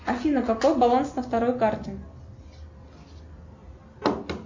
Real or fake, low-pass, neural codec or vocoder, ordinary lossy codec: real; 7.2 kHz; none; MP3, 48 kbps